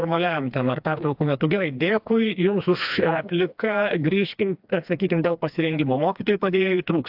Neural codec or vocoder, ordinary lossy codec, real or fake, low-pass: codec, 16 kHz, 2 kbps, FreqCodec, smaller model; AAC, 48 kbps; fake; 5.4 kHz